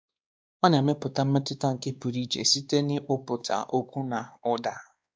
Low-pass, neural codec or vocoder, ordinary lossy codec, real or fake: none; codec, 16 kHz, 2 kbps, X-Codec, WavLM features, trained on Multilingual LibriSpeech; none; fake